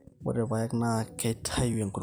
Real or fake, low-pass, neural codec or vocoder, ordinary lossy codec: fake; none; vocoder, 44.1 kHz, 128 mel bands every 256 samples, BigVGAN v2; none